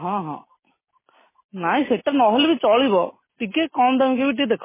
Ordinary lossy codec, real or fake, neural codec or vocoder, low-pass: MP3, 16 kbps; real; none; 3.6 kHz